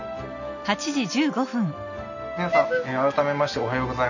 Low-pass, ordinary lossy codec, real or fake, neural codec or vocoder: 7.2 kHz; none; real; none